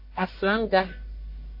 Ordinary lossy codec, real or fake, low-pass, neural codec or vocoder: MP3, 32 kbps; fake; 5.4 kHz; codec, 44.1 kHz, 1.7 kbps, Pupu-Codec